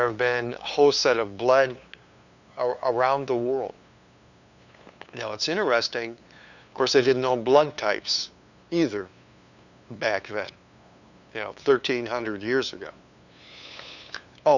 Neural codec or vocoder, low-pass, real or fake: codec, 16 kHz, 2 kbps, FunCodec, trained on LibriTTS, 25 frames a second; 7.2 kHz; fake